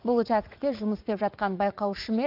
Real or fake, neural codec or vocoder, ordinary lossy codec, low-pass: fake; vocoder, 44.1 kHz, 80 mel bands, Vocos; Opus, 24 kbps; 5.4 kHz